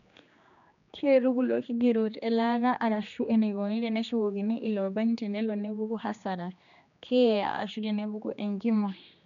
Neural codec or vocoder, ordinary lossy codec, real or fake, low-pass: codec, 16 kHz, 2 kbps, X-Codec, HuBERT features, trained on general audio; none; fake; 7.2 kHz